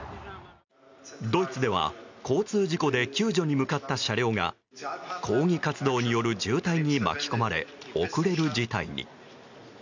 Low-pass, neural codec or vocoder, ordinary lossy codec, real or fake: 7.2 kHz; none; none; real